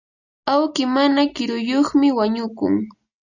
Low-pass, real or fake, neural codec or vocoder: 7.2 kHz; real; none